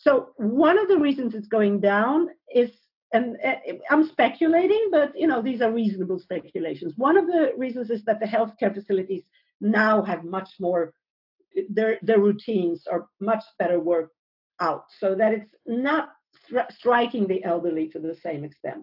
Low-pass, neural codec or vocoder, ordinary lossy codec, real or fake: 5.4 kHz; none; AAC, 48 kbps; real